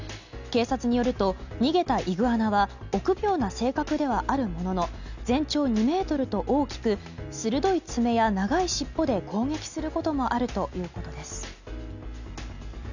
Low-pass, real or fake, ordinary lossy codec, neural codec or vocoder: 7.2 kHz; real; none; none